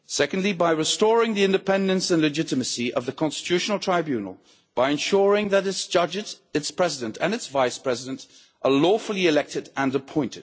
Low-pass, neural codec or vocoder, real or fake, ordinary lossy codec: none; none; real; none